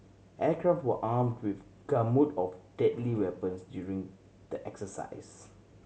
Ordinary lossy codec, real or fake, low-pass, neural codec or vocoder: none; real; none; none